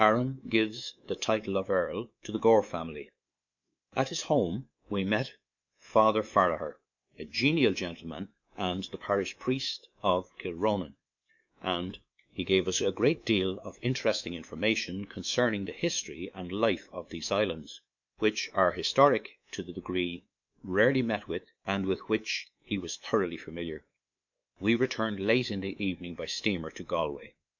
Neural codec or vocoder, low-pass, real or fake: codec, 24 kHz, 3.1 kbps, DualCodec; 7.2 kHz; fake